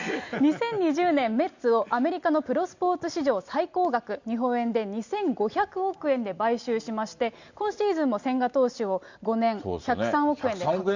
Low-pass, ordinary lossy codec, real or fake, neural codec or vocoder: 7.2 kHz; Opus, 64 kbps; real; none